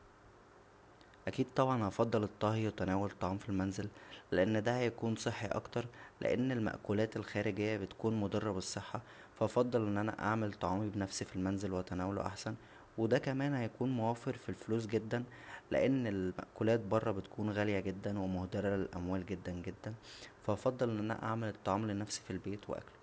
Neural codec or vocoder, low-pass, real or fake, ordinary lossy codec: none; none; real; none